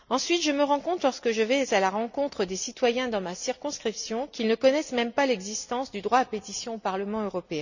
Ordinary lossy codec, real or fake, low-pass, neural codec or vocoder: none; real; 7.2 kHz; none